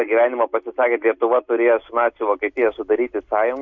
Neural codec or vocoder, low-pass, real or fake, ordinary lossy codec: none; 7.2 kHz; real; MP3, 64 kbps